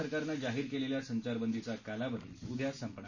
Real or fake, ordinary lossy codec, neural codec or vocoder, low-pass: real; none; none; 7.2 kHz